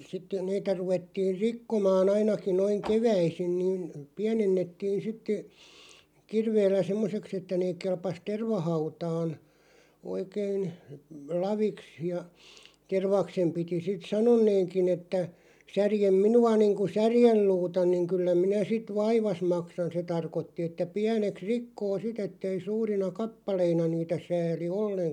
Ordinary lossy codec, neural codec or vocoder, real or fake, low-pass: none; none; real; 19.8 kHz